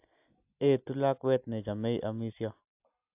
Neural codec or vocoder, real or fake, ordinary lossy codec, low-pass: none; real; AAC, 32 kbps; 3.6 kHz